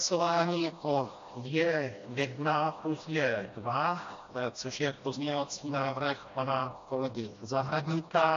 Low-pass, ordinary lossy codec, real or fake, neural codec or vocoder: 7.2 kHz; AAC, 48 kbps; fake; codec, 16 kHz, 1 kbps, FreqCodec, smaller model